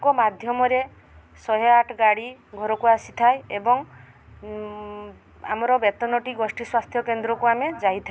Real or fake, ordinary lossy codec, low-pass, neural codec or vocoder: real; none; none; none